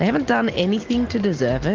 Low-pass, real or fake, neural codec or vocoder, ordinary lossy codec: 7.2 kHz; real; none; Opus, 24 kbps